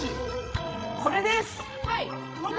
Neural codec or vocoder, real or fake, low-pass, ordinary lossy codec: codec, 16 kHz, 16 kbps, FreqCodec, larger model; fake; none; none